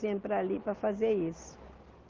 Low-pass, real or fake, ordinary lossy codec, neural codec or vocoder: 7.2 kHz; real; Opus, 16 kbps; none